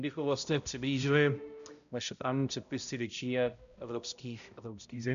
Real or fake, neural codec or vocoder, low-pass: fake; codec, 16 kHz, 0.5 kbps, X-Codec, HuBERT features, trained on balanced general audio; 7.2 kHz